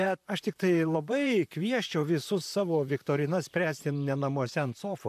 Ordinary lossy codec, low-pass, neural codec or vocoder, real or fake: AAC, 96 kbps; 14.4 kHz; vocoder, 44.1 kHz, 128 mel bands, Pupu-Vocoder; fake